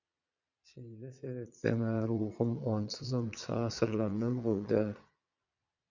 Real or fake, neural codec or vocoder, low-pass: fake; vocoder, 22.05 kHz, 80 mel bands, Vocos; 7.2 kHz